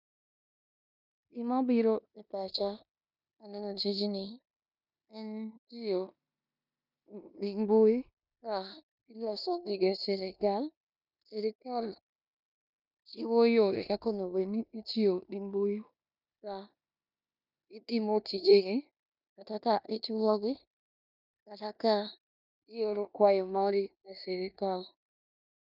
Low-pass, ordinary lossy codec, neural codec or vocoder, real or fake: 5.4 kHz; none; codec, 16 kHz in and 24 kHz out, 0.9 kbps, LongCat-Audio-Codec, four codebook decoder; fake